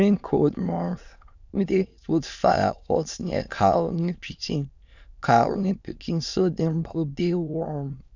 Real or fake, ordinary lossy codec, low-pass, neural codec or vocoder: fake; none; 7.2 kHz; autoencoder, 22.05 kHz, a latent of 192 numbers a frame, VITS, trained on many speakers